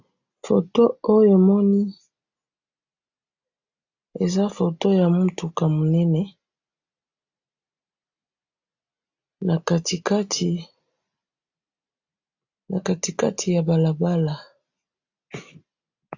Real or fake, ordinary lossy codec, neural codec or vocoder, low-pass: real; AAC, 48 kbps; none; 7.2 kHz